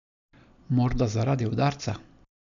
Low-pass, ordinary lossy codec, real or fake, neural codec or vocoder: 7.2 kHz; none; real; none